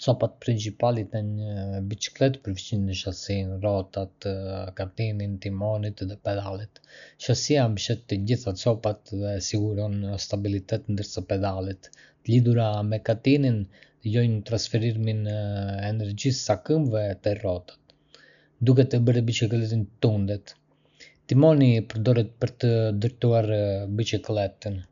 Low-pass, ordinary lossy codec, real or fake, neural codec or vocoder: 7.2 kHz; none; real; none